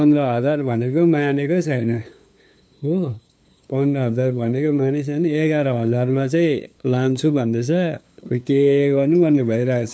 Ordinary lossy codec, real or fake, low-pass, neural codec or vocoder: none; fake; none; codec, 16 kHz, 2 kbps, FunCodec, trained on LibriTTS, 25 frames a second